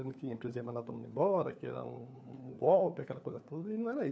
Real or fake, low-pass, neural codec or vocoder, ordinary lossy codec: fake; none; codec, 16 kHz, 4 kbps, FunCodec, trained on LibriTTS, 50 frames a second; none